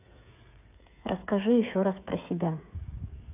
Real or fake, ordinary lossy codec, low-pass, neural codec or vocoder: fake; none; 3.6 kHz; codec, 16 kHz, 8 kbps, FreqCodec, smaller model